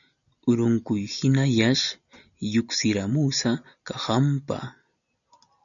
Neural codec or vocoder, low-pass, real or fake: none; 7.2 kHz; real